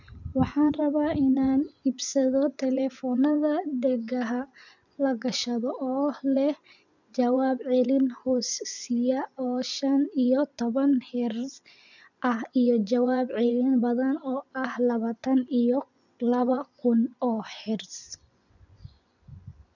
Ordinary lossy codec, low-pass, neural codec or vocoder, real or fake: none; 7.2 kHz; vocoder, 24 kHz, 100 mel bands, Vocos; fake